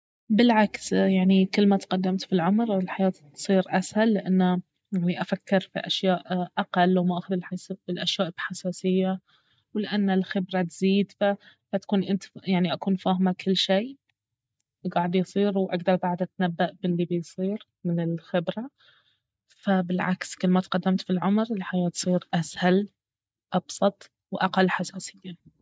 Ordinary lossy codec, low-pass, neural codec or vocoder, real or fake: none; none; none; real